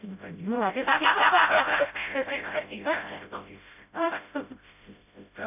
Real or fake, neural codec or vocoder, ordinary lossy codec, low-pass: fake; codec, 16 kHz, 0.5 kbps, FreqCodec, smaller model; none; 3.6 kHz